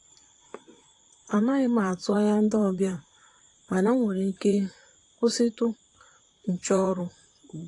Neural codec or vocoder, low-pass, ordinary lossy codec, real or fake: vocoder, 44.1 kHz, 128 mel bands, Pupu-Vocoder; 10.8 kHz; AAC, 48 kbps; fake